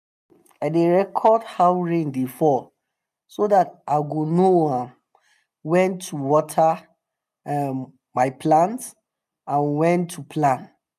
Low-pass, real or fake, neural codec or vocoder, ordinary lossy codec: 14.4 kHz; real; none; none